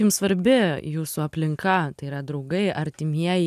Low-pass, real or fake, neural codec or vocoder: 14.4 kHz; real; none